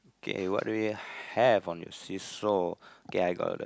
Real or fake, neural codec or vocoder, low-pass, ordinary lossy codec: real; none; none; none